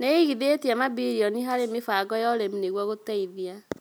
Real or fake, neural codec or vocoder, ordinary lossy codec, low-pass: real; none; none; none